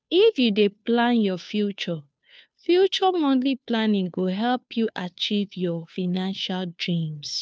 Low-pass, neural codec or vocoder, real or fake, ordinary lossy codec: none; codec, 16 kHz, 2 kbps, FunCodec, trained on Chinese and English, 25 frames a second; fake; none